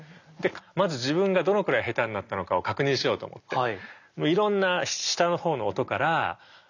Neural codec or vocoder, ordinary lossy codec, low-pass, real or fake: none; none; 7.2 kHz; real